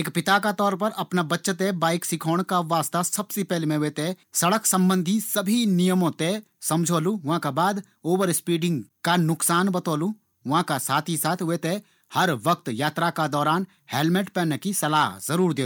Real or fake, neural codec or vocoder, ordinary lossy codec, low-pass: real; none; none; none